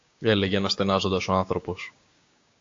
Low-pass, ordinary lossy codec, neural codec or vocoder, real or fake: 7.2 kHz; AAC, 48 kbps; codec, 16 kHz, 6 kbps, DAC; fake